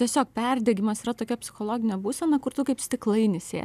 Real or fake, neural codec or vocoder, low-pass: real; none; 14.4 kHz